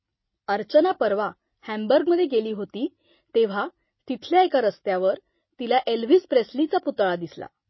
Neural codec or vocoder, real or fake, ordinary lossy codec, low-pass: none; real; MP3, 24 kbps; 7.2 kHz